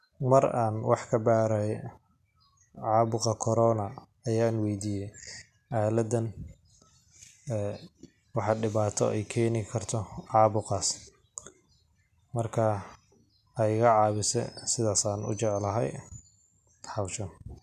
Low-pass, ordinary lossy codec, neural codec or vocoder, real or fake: 14.4 kHz; none; none; real